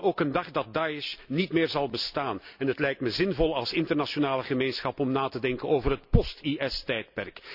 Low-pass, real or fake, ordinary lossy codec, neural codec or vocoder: 5.4 kHz; real; none; none